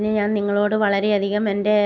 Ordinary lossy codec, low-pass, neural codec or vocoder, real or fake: none; 7.2 kHz; none; real